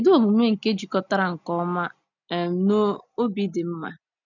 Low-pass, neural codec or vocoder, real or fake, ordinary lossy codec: 7.2 kHz; none; real; none